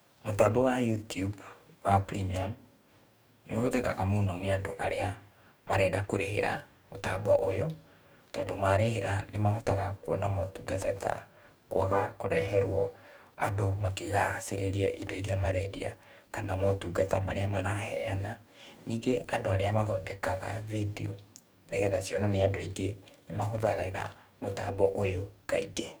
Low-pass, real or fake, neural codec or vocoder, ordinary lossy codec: none; fake; codec, 44.1 kHz, 2.6 kbps, DAC; none